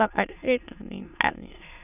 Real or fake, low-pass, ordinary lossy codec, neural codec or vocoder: fake; 3.6 kHz; none; autoencoder, 22.05 kHz, a latent of 192 numbers a frame, VITS, trained on many speakers